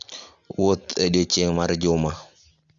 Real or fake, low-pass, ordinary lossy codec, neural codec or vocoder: real; 7.2 kHz; Opus, 64 kbps; none